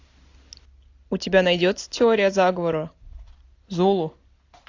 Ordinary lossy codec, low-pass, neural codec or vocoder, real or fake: AAC, 48 kbps; 7.2 kHz; none; real